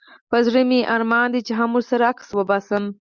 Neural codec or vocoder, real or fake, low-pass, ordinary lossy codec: none; real; 7.2 kHz; Opus, 64 kbps